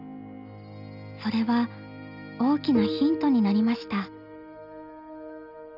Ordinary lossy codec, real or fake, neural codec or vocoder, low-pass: none; real; none; 5.4 kHz